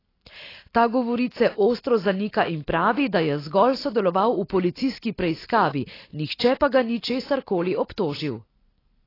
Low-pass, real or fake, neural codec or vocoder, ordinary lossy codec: 5.4 kHz; real; none; AAC, 24 kbps